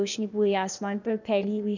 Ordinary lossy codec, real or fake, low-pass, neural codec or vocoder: none; fake; 7.2 kHz; codec, 16 kHz, 0.8 kbps, ZipCodec